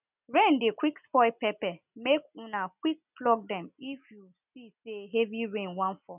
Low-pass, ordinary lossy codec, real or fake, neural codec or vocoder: 3.6 kHz; none; real; none